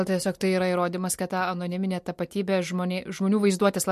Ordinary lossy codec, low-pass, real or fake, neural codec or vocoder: MP3, 64 kbps; 14.4 kHz; real; none